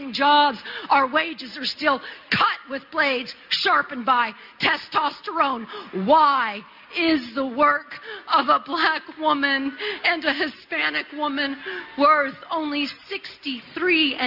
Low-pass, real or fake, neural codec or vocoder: 5.4 kHz; real; none